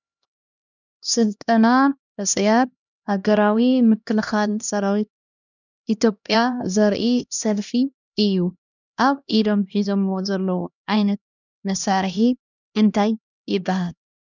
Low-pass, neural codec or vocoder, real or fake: 7.2 kHz; codec, 16 kHz, 1 kbps, X-Codec, HuBERT features, trained on LibriSpeech; fake